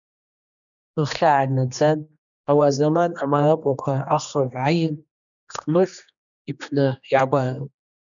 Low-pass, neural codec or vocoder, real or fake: 7.2 kHz; codec, 16 kHz, 2 kbps, X-Codec, HuBERT features, trained on general audio; fake